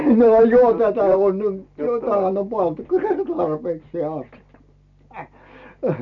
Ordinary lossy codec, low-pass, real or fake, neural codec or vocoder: none; 7.2 kHz; real; none